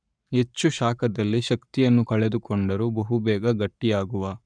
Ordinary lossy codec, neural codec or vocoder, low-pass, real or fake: none; codec, 44.1 kHz, 7.8 kbps, Pupu-Codec; 9.9 kHz; fake